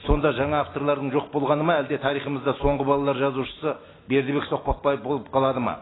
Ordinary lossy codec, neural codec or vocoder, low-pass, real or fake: AAC, 16 kbps; none; 7.2 kHz; real